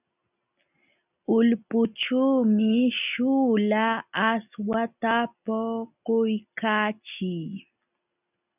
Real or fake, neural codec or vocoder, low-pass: real; none; 3.6 kHz